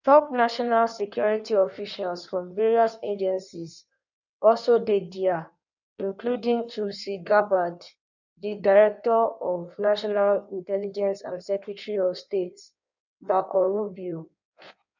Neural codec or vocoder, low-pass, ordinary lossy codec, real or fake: codec, 16 kHz in and 24 kHz out, 1.1 kbps, FireRedTTS-2 codec; 7.2 kHz; none; fake